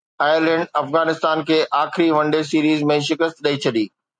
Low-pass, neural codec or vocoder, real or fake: 9.9 kHz; none; real